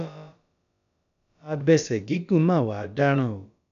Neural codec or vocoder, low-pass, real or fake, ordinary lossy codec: codec, 16 kHz, about 1 kbps, DyCAST, with the encoder's durations; 7.2 kHz; fake; none